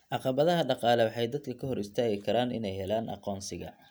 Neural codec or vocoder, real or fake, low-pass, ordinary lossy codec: none; real; none; none